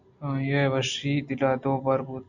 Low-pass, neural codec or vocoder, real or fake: 7.2 kHz; none; real